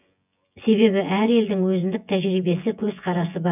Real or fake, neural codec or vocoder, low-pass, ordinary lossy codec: fake; vocoder, 24 kHz, 100 mel bands, Vocos; 3.6 kHz; none